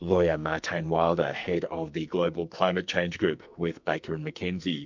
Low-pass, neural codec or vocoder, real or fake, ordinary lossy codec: 7.2 kHz; codec, 44.1 kHz, 2.6 kbps, SNAC; fake; MP3, 64 kbps